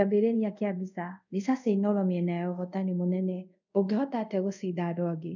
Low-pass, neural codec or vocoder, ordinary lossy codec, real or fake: 7.2 kHz; codec, 24 kHz, 0.5 kbps, DualCodec; none; fake